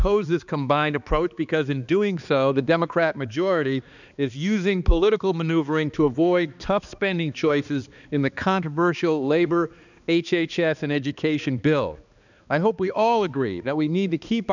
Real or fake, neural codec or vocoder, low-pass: fake; codec, 16 kHz, 2 kbps, X-Codec, HuBERT features, trained on balanced general audio; 7.2 kHz